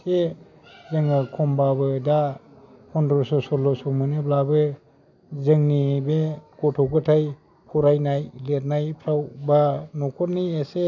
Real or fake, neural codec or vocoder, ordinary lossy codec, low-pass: real; none; none; 7.2 kHz